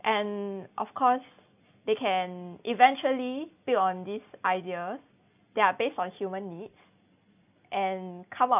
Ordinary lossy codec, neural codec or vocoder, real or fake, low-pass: none; none; real; 3.6 kHz